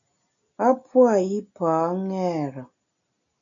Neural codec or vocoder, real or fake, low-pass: none; real; 7.2 kHz